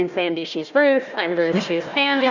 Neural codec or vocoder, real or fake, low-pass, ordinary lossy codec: codec, 16 kHz, 1 kbps, FunCodec, trained on Chinese and English, 50 frames a second; fake; 7.2 kHz; Opus, 64 kbps